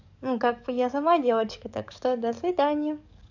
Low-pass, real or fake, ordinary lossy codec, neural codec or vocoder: 7.2 kHz; fake; none; codec, 44.1 kHz, 7.8 kbps, DAC